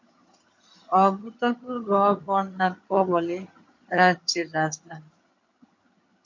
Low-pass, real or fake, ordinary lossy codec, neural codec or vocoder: 7.2 kHz; fake; MP3, 48 kbps; vocoder, 22.05 kHz, 80 mel bands, HiFi-GAN